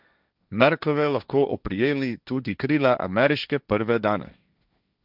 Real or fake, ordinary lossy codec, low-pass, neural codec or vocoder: fake; none; 5.4 kHz; codec, 16 kHz, 1.1 kbps, Voila-Tokenizer